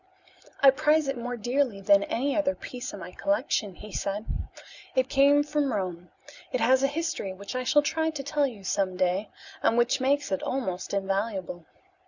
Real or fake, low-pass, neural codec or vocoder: real; 7.2 kHz; none